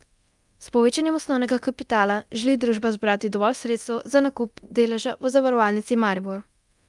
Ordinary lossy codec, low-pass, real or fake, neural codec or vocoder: Opus, 32 kbps; 10.8 kHz; fake; codec, 24 kHz, 0.9 kbps, DualCodec